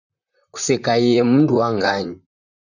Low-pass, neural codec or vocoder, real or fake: 7.2 kHz; vocoder, 44.1 kHz, 128 mel bands, Pupu-Vocoder; fake